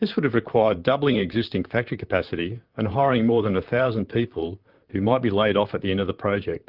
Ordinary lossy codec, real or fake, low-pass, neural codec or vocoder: Opus, 32 kbps; fake; 5.4 kHz; vocoder, 44.1 kHz, 128 mel bands, Pupu-Vocoder